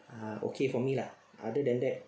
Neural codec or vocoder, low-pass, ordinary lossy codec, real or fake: none; none; none; real